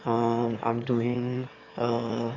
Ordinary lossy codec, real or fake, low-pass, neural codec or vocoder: AAC, 32 kbps; fake; 7.2 kHz; autoencoder, 22.05 kHz, a latent of 192 numbers a frame, VITS, trained on one speaker